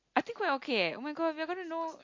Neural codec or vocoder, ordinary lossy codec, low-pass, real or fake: none; MP3, 48 kbps; 7.2 kHz; real